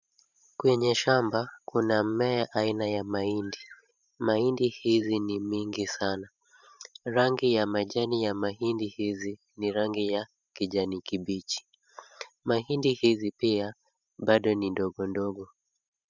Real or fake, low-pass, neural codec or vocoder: fake; 7.2 kHz; vocoder, 44.1 kHz, 128 mel bands every 512 samples, BigVGAN v2